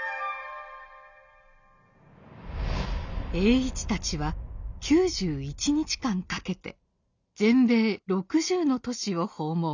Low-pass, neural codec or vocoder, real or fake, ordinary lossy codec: 7.2 kHz; none; real; none